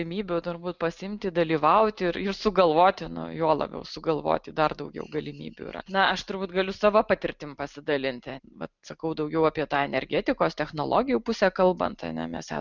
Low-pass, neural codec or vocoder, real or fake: 7.2 kHz; none; real